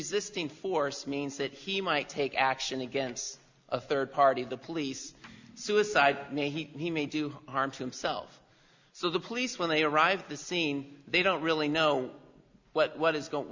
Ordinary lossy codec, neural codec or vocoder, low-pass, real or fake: Opus, 64 kbps; none; 7.2 kHz; real